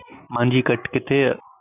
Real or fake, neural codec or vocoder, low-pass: real; none; 3.6 kHz